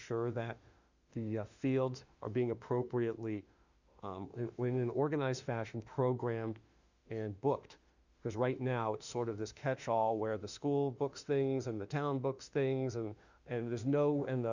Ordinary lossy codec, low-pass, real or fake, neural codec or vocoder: Opus, 64 kbps; 7.2 kHz; fake; autoencoder, 48 kHz, 32 numbers a frame, DAC-VAE, trained on Japanese speech